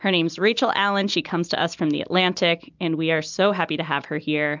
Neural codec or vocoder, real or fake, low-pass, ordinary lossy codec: none; real; 7.2 kHz; MP3, 64 kbps